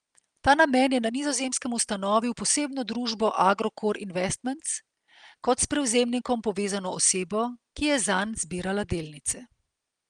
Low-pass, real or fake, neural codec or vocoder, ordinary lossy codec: 9.9 kHz; real; none; Opus, 24 kbps